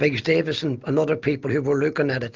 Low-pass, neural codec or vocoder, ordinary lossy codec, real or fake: 7.2 kHz; none; Opus, 24 kbps; real